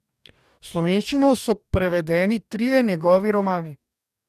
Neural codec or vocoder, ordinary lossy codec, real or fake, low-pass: codec, 44.1 kHz, 2.6 kbps, DAC; none; fake; 14.4 kHz